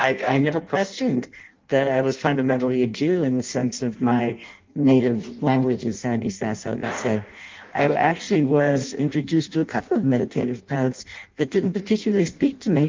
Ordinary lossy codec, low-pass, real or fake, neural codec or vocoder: Opus, 24 kbps; 7.2 kHz; fake; codec, 16 kHz in and 24 kHz out, 0.6 kbps, FireRedTTS-2 codec